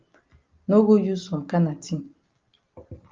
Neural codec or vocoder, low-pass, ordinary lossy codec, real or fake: none; 7.2 kHz; Opus, 32 kbps; real